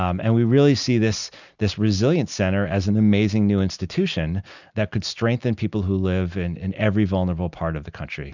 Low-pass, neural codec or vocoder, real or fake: 7.2 kHz; none; real